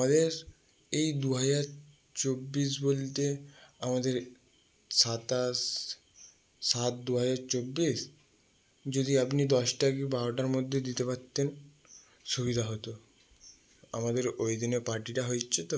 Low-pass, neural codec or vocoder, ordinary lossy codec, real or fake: none; none; none; real